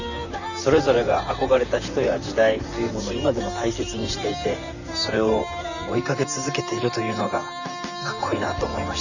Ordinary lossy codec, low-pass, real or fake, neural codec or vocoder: none; 7.2 kHz; fake; vocoder, 44.1 kHz, 128 mel bands, Pupu-Vocoder